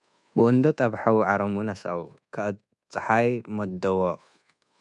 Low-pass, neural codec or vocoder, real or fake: 10.8 kHz; codec, 24 kHz, 1.2 kbps, DualCodec; fake